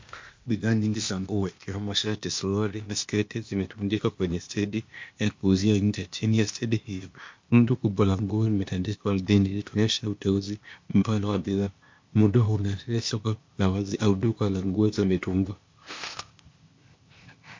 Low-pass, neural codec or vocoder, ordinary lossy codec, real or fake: 7.2 kHz; codec, 16 kHz, 0.8 kbps, ZipCodec; MP3, 48 kbps; fake